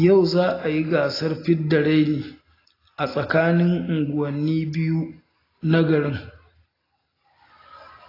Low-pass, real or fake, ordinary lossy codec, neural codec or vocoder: 5.4 kHz; real; AAC, 24 kbps; none